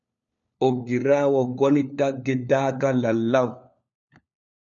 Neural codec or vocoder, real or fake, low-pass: codec, 16 kHz, 4 kbps, FunCodec, trained on LibriTTS, 50 frames a second; fake; 7.2 kHz